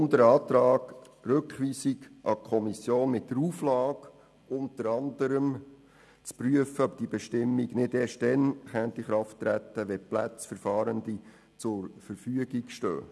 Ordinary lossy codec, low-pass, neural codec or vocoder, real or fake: none; none; none; real